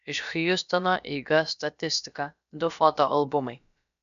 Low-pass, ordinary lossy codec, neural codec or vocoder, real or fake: 7.2 kHz; MP3, 96 kbps; codec, 16 kHz, about 1 kbps, DyCAST, with the encoder's durations; fake